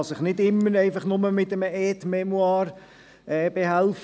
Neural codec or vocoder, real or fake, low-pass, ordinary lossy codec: none; real; none; none